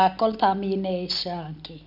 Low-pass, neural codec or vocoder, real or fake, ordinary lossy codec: 5.4 kHz; none; real; none